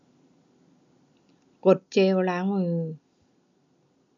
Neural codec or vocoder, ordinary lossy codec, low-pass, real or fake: none; none; 7.2 kHz; real